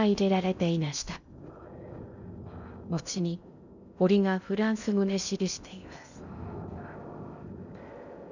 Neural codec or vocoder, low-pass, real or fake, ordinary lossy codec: codec, 16 kHz in and 24 kHz out, 0.6 kbps, FocalCodec, streaming, 2048 codes; 7.2 kHz; fake; none